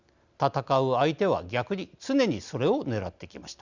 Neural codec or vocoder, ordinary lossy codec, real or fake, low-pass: none; Opus, 64 kbps; real; 7.2 kHz